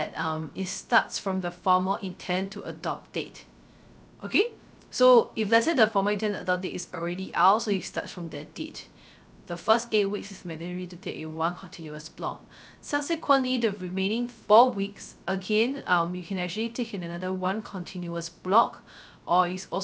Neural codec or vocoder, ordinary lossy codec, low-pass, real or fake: codec, 16 kHz, 0.3 kbps, FocalCodec; none; none; fake